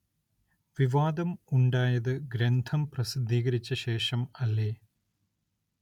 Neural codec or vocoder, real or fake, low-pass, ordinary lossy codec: none; real; 19.8 kHz; none